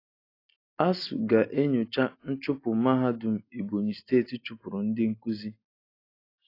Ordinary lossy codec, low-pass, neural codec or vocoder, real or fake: AAC, 32 kbps; 5.4 kHz; none; real